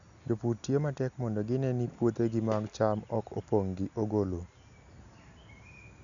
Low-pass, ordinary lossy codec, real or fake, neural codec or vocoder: 7.2 kHz; none; real; none